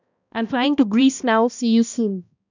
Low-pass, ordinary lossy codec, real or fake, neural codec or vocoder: 7.2 kHz; none; fake; codec, 16 kHz, 1 kbps, X-Codec, HuBERT features, trained on balanced general audio